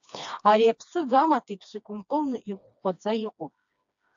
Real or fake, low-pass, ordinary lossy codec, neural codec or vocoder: fake; 7.2 kHz; AAC, 64 kbps; codec, 16 kHz, 2 kbps, FreqCodec, smaller model